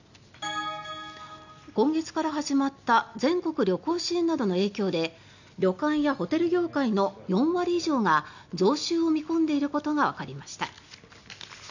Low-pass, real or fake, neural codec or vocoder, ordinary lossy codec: 7.2 kHz; real; none; none